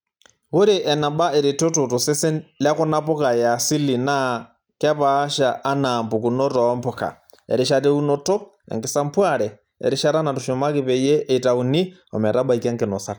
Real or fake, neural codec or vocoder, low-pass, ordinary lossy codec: real; none; none; none